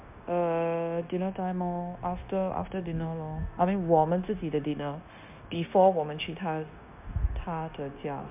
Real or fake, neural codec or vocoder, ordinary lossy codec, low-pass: fake; codec, 16 kHz, 0.9 kbps, LongCat-Audio-Codec; MP3, 32 kbps; 3.6 kHz